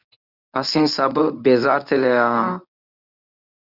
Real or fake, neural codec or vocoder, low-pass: fake; codec, 24 kHz, 0.9 kbps, WavTokenizer, medium speech release version 1; 5.4 kHz